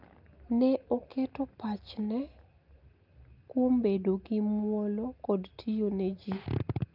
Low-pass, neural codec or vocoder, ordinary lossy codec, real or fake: 5.4 kHz; none; Opus, 24 kbps; real